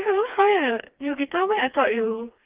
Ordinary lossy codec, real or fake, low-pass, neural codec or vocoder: Opus, 32 kbps; fake; 3.6 kHz; codec, 16 kHz, 2 kbps, FreqCodec, smaller model